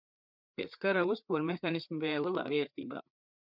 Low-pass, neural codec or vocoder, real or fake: 5.4 kHz; codec, 16 kHz, 4 kbps, FreqCodec, larger model; fake